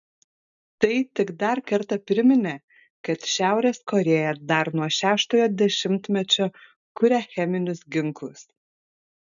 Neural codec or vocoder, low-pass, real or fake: none; 7.2 kHz; real